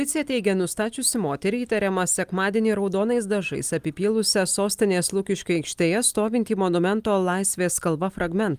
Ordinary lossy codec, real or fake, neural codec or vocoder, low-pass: Opus, 32 kbps; real; none; 14.4 kHz